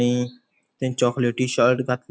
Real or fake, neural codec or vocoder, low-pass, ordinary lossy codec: real; none; none; none